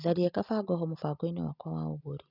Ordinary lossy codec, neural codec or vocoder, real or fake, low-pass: none; none; real; 5.4 kHz